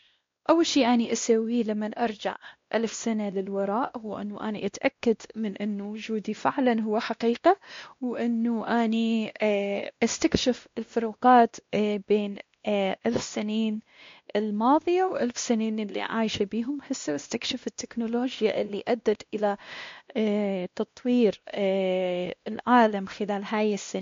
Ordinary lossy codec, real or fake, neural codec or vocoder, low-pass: MP3, 48 kbps; fake; codec, 16 kHz, 1 kbps, X-Codec, WavLM features, trained on Multilingual LibriSpeech; 7.2 kHz